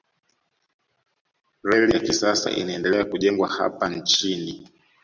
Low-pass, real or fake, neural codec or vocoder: 7.2 kHz; real; none